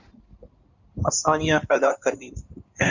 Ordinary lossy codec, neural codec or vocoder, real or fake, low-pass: Opus, 64 kbps; codec, 16 kHz in and 24 kHz out, 2.2 kbps, FireRedTTS-2 codec; fake; 7.2 kHz